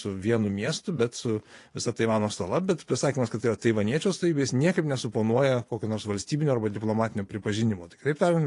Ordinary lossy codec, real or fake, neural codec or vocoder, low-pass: AAC, 48 kbps; fake; vocoder, 24 kHz, 100 mel bands, Vocos; 10.8 kHz